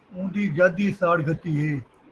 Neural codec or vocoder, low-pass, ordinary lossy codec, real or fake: autoencoder, 48 kHz, 128 numbers a frame, DAC-VAE, trained on Japanese speech; 10.8 kHz; Opus, 16 kbps; fake